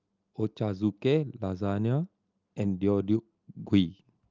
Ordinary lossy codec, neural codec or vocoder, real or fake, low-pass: Opus, 24 kbps; none; real; 7.2 kHz